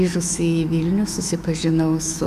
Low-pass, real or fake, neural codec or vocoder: 14.4 kHz; fake; autoencoder, 48 kHz, 128 numbers a frame, DAC-VAE, trained on Japanese speech